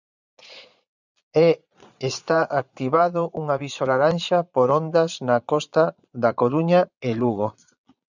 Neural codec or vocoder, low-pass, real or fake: vocoder, 22.05 kHz, 80 mel bands, Vocos; 7.2 kHz; fake